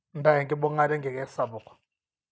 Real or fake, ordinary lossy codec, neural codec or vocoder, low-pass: real; none; none; none